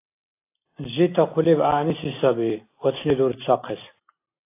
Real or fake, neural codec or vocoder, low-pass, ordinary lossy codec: real; none; 3.6 kHz; AAC, 24 kbps